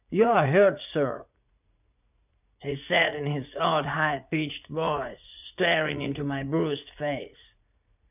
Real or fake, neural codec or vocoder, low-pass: fake; vocoder, 44.1 kHz, 80 mel bands, Vocos; 3.6 kHz